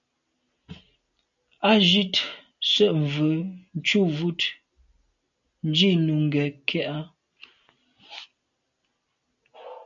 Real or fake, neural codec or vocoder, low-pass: real; none; 7.2 kHz